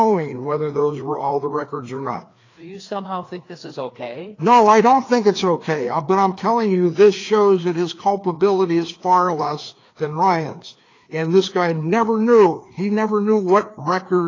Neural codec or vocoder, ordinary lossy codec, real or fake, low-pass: codec, 16 kHz, 2 kbps, FreqCodec, larger model; AAC, 32 kbps; fake; 7.2 kHz